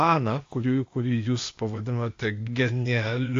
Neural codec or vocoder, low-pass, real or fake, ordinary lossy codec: codec, 16 kHz, 0.8 kbps, ZipCodec; 7.2 kHz; fake; AAC, 48 kbps